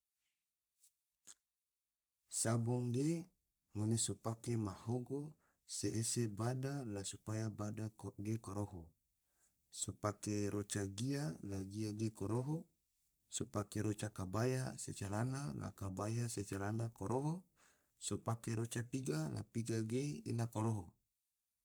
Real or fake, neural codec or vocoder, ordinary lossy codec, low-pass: fake; codec, 44.1 kHz, 3.4 kbps, Pupu-Codec; none; none